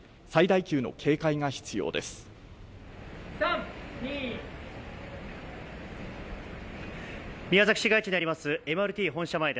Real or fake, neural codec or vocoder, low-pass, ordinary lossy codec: real; none; none; none